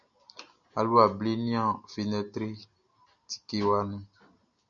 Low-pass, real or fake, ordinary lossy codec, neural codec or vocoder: 7.2 kHz; real; MP3, 96 kbps; none